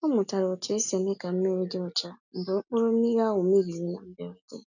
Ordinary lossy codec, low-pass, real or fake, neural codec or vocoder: none; 7.2 kHz; real; none